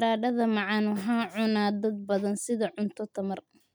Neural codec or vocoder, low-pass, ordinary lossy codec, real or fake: none; none; none; real